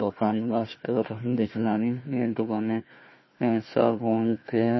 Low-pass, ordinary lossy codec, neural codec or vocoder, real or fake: 7.2 kHz; MP3, 24 kbps; codec, 16 kHz, 1 kbps, FunCodec, trained on Chinese and English, 50 frames a second; fake